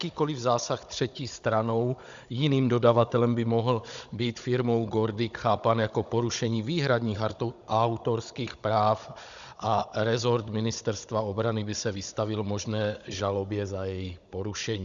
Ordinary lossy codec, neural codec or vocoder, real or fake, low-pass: Opus, 64 kbps; codec, 16 kHz, 16 kbps, FunCodec, trained on Chinese and English, 50 frames a second; fake; 7.2 kHz